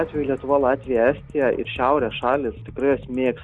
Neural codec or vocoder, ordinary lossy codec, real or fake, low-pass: none; Opus, 64 kbps; real; 10.8 kHz